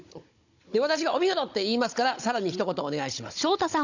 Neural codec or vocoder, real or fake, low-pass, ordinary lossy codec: codec, 16 kHz, 16 kbps, FunCodec, trained on Chinese and English, 50 frames a second; fake; 7.2 kHz; none